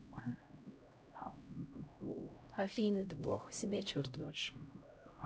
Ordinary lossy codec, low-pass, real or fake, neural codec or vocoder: none; none; fake; codec, 16 kHz, 0.5 kbps, X-Codec, HuBERT features, trained on LibriSpeech